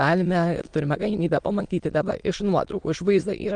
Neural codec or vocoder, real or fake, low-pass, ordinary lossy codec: autoencoder, 22.05 kHz, a latent of 192 numbers a frame, VITS, trained on many speakers; fake; 9.9 kHz; Opus, 24 kbps